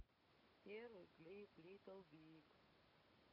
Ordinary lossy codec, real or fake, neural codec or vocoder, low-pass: none; real; none; 5.4 kHz